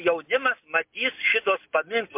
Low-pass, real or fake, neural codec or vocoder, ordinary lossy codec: 3.6 kHz; real; none; MP3, 32 kbps